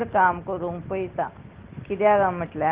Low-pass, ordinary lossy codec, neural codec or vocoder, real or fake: 3.6 kHz; Opus, 16 kbps; none; real